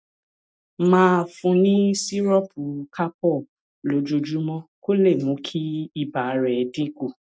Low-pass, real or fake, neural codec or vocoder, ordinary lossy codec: none; real; none; none